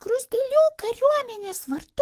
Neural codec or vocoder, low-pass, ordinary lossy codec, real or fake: codec, 44.1 kHz, 7.8 kbps, DAC; 14.4 kHz; Opus, 24 kbps; fake